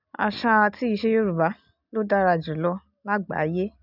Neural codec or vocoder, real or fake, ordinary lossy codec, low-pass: none; real; none; 5.4 kHz